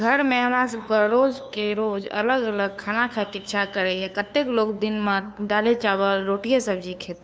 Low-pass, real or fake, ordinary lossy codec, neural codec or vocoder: none; fake; none; codec, 16 kHz, 2 kbps, FunCodec, trained on LibriTTS, 25 frames a second